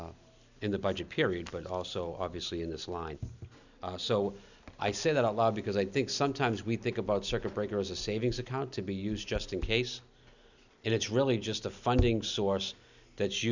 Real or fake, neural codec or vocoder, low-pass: real; none; 7.2 kHz